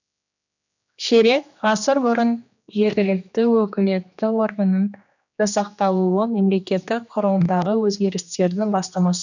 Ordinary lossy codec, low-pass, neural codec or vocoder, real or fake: none; 7.2 kHz; codec, 16 kHz, 2 kbps, X-Codec, HuBERT features, trained on general audio; fake